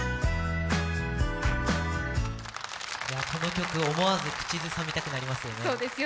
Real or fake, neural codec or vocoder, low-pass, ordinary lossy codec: real; none; none; none